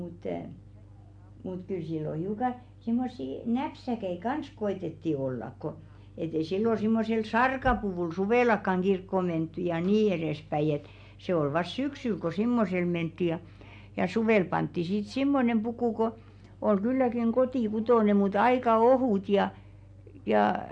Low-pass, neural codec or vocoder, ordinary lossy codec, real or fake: 9.9 kHz; none; MP3, 64 kbps; real